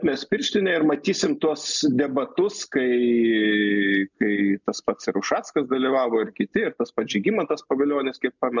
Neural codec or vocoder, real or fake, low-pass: none; real; 7.2 kHz